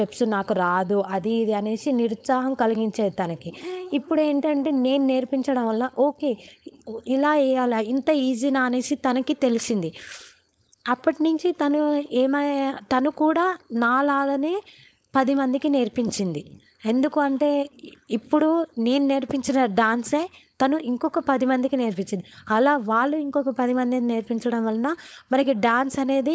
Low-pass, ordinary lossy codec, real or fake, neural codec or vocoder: none; none; fake; codec, 16 kHz, 4.8 kbps, FACodec